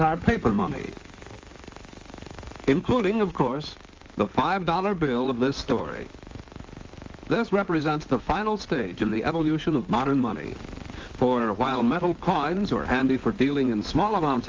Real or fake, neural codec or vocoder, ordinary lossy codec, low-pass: fake; codec, 16 kHz in and 24 kHz out, 2.2 kbps, FireRedTTS-2 codec; Opus, 32 kbps; 7.2 kHz